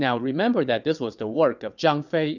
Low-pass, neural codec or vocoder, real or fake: 7.2 kHz; vocoder, 22.05 kHz, 80 mel bands, WaveNeXt; fake